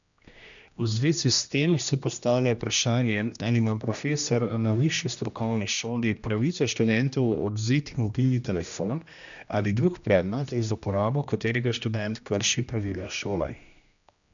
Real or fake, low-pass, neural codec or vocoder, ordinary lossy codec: fake; 7.2 kHz; codec, 16 kHz, 1 kbps, X-Codec, HuBERT features, trained on general audio; MP3, 96 kbps